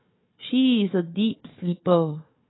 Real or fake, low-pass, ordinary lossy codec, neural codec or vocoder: fake; 7.2 kHz; AAC, 16 kbps; codec, 16 kHz, 4 kbps, FunCodec, trained on Chinese and English, 50 frames a second